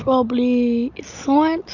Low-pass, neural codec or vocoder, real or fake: 7.2 kHz; none; real